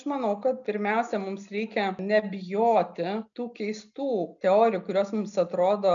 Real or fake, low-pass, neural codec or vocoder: real; 7.2 kHz; none